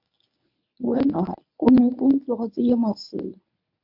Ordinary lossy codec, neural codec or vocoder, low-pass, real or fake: MP3, 48 kbps; codec, 24 kHz, 0.9 kbps, WavTokenizer, medium speech release version 1; 5.4 kHz; fake